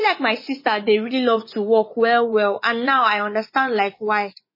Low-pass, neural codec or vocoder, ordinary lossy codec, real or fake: 5.4 kHz; vocoder, 24 kHz, 100 mel bands, Vocos; MP3, 24 kbps; fake